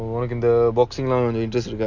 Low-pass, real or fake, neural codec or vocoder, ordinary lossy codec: 7.2 kHz; real; none; none